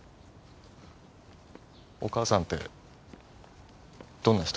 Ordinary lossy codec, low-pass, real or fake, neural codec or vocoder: none; none; real; none